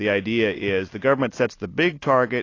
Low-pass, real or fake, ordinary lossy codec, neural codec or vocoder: 7.2 kHz; real; AAC, 32 kbps; none